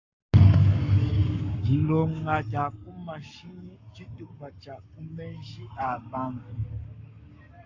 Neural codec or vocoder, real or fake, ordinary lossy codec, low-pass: codec, 44.1 kHz, 7.8 kbps, Pupu-Codec; fake; AAC, 48 kbps; 7.2 kHz